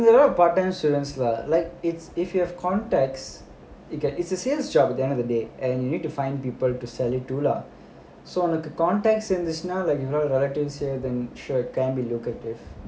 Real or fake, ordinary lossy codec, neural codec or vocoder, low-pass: real; none; none; none